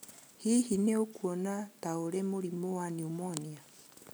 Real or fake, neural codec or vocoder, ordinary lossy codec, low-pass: real; none; none; none